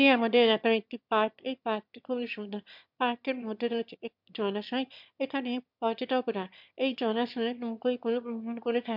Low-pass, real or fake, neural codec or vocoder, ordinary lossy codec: 5.4 kHz; fake; autoencoder, 22.05 kHz, a latent of 192 numbers a frame, VITS, trained on one speaker; MP3, 48 kbps